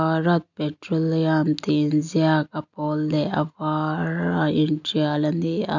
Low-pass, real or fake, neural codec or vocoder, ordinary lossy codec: 7.2 kHz; real; none; none